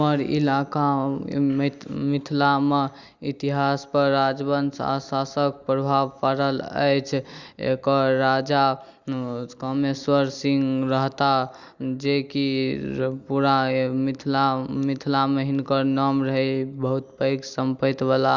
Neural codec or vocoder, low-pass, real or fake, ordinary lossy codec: none; 7.2 kHz; real; Opus, 64 kbps